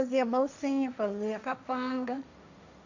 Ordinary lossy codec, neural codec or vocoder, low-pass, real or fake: none; codec, 16 kHz, 1.1 kbps, Voila-Tokenizer; 7.2 kHz; fake